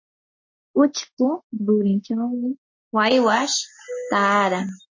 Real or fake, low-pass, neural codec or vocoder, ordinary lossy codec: real; 7.2 kHz; none; MP3, 32 kbps